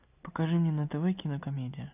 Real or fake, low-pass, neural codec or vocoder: real; 3.6 kHz; none